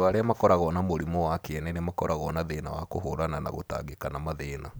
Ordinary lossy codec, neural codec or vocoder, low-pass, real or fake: none; none; none; real